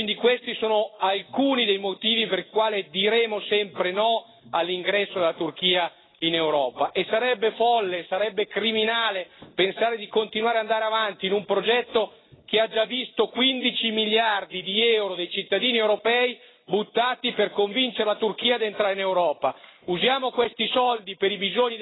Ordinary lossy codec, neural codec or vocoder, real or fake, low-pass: AAC, 16 kbps; none; real; 7.2 kHz